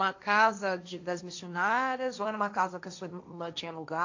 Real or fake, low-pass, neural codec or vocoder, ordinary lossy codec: fake; 7.2 kHz; codec, 16 kHz, 1.1 kbps, Voila-Tokenizer; AAC, 48 kbps